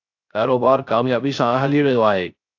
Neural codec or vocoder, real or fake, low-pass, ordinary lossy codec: codec, 16 kHz, 0.3 kbps, FocalCodec; fake; 7.2 kHz; AAC, 48 kbps